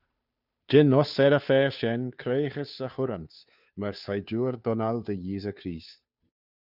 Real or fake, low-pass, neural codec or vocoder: fake; 5.4 kHz; codec, 16 kHz, 2 kbps, FunCodec, trained on Chinese and English, 25 frames a second